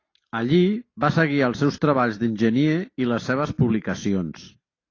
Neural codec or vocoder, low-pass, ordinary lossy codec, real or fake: none; 7.2 kHz; AAC, 32 kbps; real